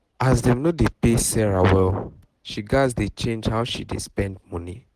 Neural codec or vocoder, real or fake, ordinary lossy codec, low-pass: none; real; Opus, 16 kbps; 14.4 kHz